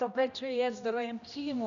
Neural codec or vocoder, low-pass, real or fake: codec, 16 kHz, 1 kbps, X-Codec, HuBERT features, trained on general audio; 7.2 kHz; fake